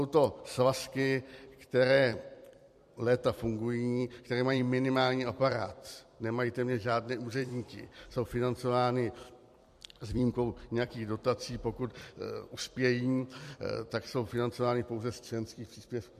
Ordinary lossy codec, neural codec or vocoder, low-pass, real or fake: MP3, 64 kbps; vocoder, 44.1 kHz, 128 mel bands every 256 samples, BigVGAN v2; 14.4 kHz; fake